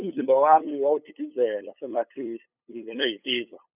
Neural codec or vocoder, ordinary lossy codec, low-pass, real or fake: codec, 16 kHz, 8 kbps, FunCodec, trained on LibriTTS, 25 frames a second; none; 3.6 kHz; fake